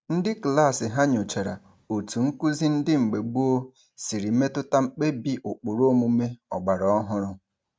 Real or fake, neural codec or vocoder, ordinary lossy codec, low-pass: real; none; none; none